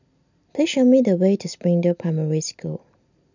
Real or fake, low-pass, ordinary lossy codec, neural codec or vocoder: real; 7.2 kHz; none; none